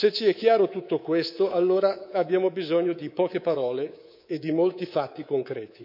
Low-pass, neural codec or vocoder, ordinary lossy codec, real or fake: 5.4 kHz; codec, 24 kHz, 3.1 kbps, DualCodec; none; fake